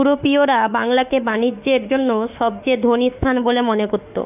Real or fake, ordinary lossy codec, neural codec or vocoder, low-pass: fake; none; autoencoder, 48 kHz, 32 numbers a frame, DAC-VAE, trained on Japanese speech; 3.6 kHz